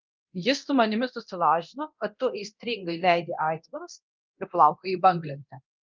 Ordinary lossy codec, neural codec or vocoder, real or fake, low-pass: Opus, 24 kbps; codec, 24 kHz, 0.9 kbps, DualCodec; fake; 7.2 kHz